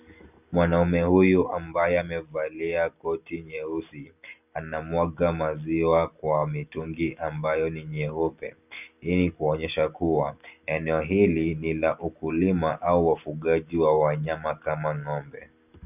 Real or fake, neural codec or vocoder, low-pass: real; none; 3.6 kHz